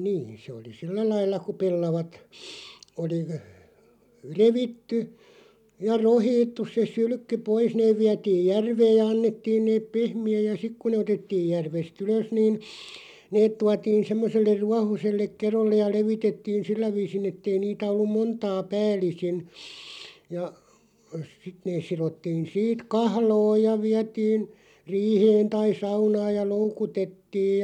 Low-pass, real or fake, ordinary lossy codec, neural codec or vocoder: 19.8 kHz; real; none; none